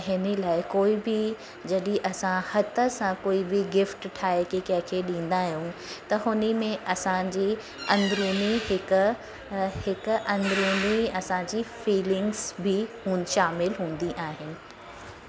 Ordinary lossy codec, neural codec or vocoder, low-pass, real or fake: none; none; none; real